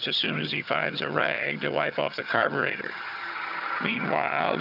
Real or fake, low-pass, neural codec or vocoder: fake; 5.4 kHz; vocoder, 22.05 kHz, 80 mel bands, HiFi-GAN